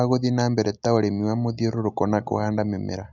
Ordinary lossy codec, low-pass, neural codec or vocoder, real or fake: none; 7.2 kHz; none; real